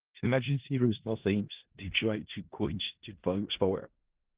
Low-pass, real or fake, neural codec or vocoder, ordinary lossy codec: 3.6 kHz; fake; codec, 16 kHz in and 24 kHz out, 0.4 kbps, LongCat-Audio-Codec, four codebook decoder; Opus, 16 kbps